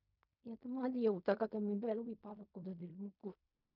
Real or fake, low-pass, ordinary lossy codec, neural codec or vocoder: fake; 5.4 kHz; none; codec, 16 kHz in and 24 kHz out, 0.4 kbps, LongCat-Audio-Codec, fine tuned four codebook decoder